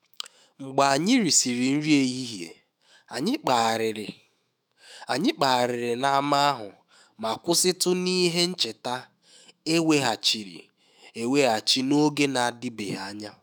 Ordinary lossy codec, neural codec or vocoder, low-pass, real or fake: none; autoencoder, 48 kHz, 128 numbers a frame, DAC-VAE, trained on Japanese speech; none; fake